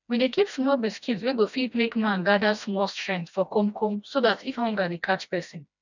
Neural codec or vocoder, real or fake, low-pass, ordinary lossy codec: codec, 16 kHz, 1 kbps, FreqCodec, smaller model; fake; 7.2 kHz; none